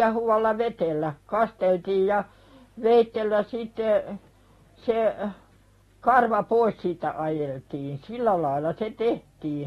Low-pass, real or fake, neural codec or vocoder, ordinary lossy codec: 19.8 kHz; real; none; AAC, 32 kbps